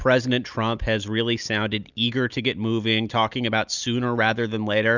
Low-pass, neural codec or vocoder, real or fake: 7.2 kHz; none; real